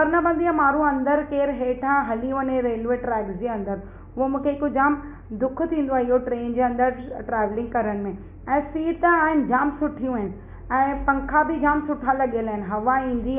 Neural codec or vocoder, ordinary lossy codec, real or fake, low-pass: none; AAC, 32 kbps; real; 3.6 kHz